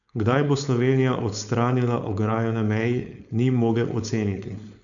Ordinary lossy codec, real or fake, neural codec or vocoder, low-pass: none; fake; codec, 16 kHz, 4.8 kbps, FACodec; 7.2 kHz